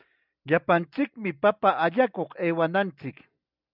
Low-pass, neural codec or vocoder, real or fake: 5.4 kHz; none; real